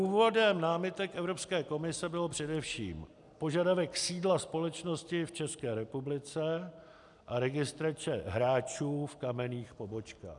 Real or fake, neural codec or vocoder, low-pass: real; none; 10.8 kHz